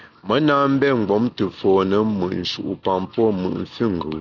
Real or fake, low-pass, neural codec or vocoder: real; 7.2 kHz; none